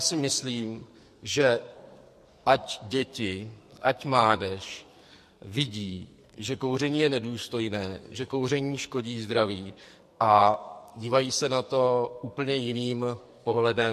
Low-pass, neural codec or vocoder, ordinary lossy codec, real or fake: 14.4 kHz; codec, 44.1 kHz, 2.6 kbps, SNAC; MP3, 64 kbps; fake